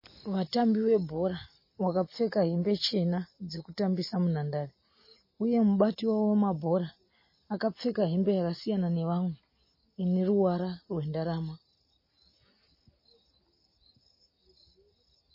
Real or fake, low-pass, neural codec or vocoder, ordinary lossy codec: real; 5.4 kHz; none; MP3, 24 kbps